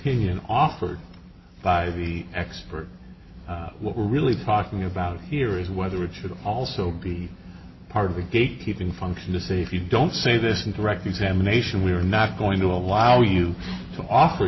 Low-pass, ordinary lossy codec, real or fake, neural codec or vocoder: 7.2 kHz; MP3, 24 kbps; real; none